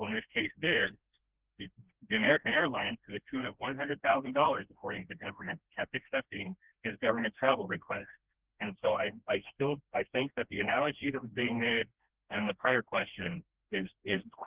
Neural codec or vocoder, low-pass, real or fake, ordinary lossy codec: codec, 16 kHz, 1 kbps, FreqCodec, smaller model; 3.6 kHz; fake; Opus, 16 kbps